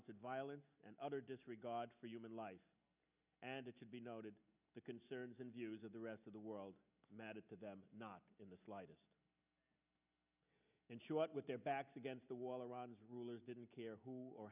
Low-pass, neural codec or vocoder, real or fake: 3.6 kHz; none; real